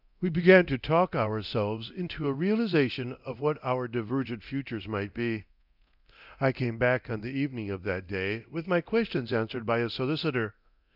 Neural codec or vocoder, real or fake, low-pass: codec, 24 kHz, 0.9 kbps, DualCodec; fake; 5.4 kHz